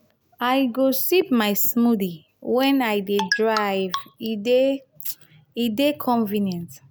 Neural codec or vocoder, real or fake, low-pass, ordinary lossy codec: none; real; none; none